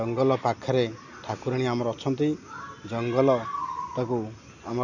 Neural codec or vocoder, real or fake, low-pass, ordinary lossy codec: none; real; 7.2 kHz; none